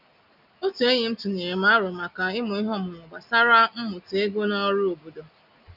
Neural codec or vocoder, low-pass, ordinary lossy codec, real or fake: none; 5.4 kHz; none; real